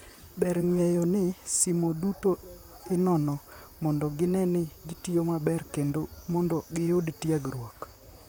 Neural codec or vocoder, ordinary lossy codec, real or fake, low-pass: vocoder, 44.1 kHz, 128 mel bands, Pupu-Vocoder; none; fake; none